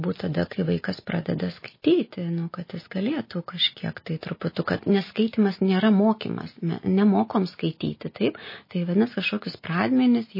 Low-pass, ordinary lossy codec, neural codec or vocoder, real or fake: 5.4 kHz; MP3, 24 kbps; none; real